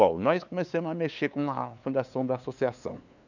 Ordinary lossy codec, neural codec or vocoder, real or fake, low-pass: none; codec, 16 kHz, 2 kbps, FunCodec, trained on LibriTTS, 25 frames a second; fake; 7.2 kHz